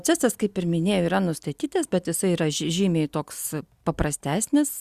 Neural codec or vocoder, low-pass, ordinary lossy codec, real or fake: none; 14.4 kHz; Opus, 64 kbps; real